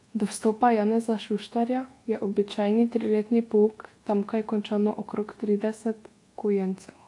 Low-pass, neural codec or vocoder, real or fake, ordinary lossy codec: 10.8 kHz; codec, 24 kHz, 1.2 kbps, DualCodec; fake; AAC, 48 kbps